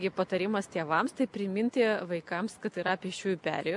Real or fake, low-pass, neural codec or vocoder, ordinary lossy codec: real; 10.8 kHz; none; MP3, 64 kbps